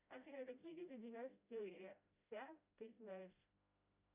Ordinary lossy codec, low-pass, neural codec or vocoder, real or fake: Opus, 64 kbps; 3.6 kHz; codec, 16 kHz, 0.5 kbps, FreqCodec, smaller model; fake